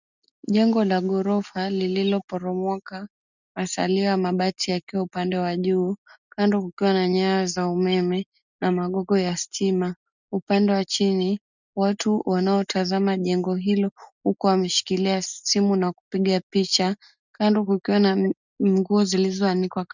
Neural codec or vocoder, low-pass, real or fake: none; 7.2 kHz; real